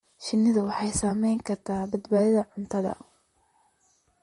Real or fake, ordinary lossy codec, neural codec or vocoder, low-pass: fake; MP3, 48 kbps; vocoder, 44.1 kHz, 128 mel bands, Pupu-Vocoder; 19.8 kHz